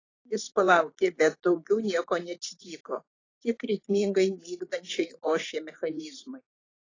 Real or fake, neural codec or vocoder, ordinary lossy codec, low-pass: fake; vocoder, 44.1 kHz, 128 mel bands every 512 samples, BigVGAN v2; AAC, 32 kbps; 7.2 kHz